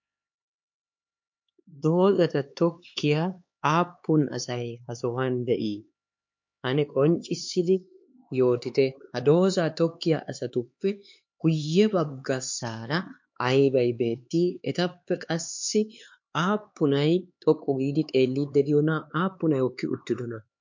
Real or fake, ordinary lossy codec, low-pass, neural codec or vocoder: fake; MP3, 48 kbps; 7.2 kHz; codec, 16 kHz, 4 kbps, X-Codec, HuBERT features, trained on LibriSpeech